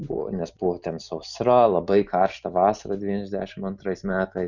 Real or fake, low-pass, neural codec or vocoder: real; 7.2 kHz; none